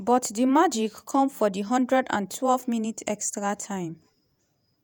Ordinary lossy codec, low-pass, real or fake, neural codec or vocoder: none; none; fake; vocoder, 48 kHz, 128 mel bands, Vocos